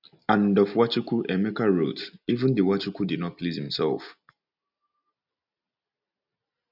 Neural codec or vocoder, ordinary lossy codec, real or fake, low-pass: none; none; real; 5.4 kHz